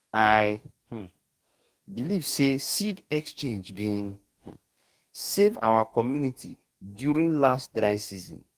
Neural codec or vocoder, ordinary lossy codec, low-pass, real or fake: codec, 44.1 kHz, 2.6 kbps, DAC; Opus, 32 kbps; 14.4 kHz; fake